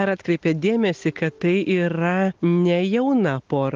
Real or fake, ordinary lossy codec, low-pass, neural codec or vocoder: real; Opus, 32 kbps; 7.2 kHz; none